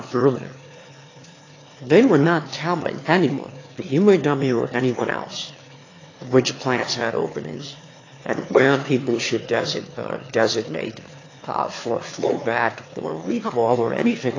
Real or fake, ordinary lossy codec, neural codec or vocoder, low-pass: fake; AAC, 32 kbps; autoencoder, 22.05 kHz, a latent of 192 numbers a frame, VITS, trained on one speaker; 7.2 kHz